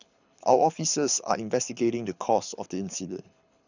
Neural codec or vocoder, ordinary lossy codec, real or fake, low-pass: codec, 24 kHz, 6 kbps, HILCodec; none; fake; 7.2 kHz